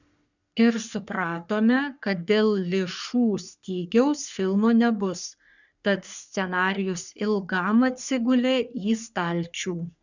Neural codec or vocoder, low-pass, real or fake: codec, 44.1 kHz, 3.4 kbps, Pupu-Codec; 7.2 kHz; fake